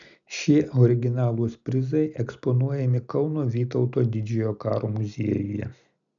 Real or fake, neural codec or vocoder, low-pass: real; none; 7.2 kHz